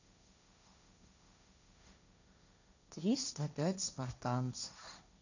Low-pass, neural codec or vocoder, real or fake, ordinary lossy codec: 7.2 kHz; codec, 16 kHz, 1.1 kbps, Voila-Tokenizer; fake; none